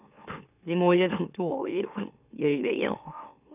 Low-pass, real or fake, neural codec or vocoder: 3.6 kHz; fake; autoencoder, 44.1 kHz, a latent of 192 numbers a frame, MeloTTS